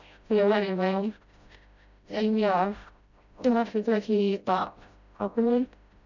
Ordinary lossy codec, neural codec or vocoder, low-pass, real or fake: none; codec, 16 kHz, 0.5 kbps, FreqCodec, smaller model; 7.2 kHz; fake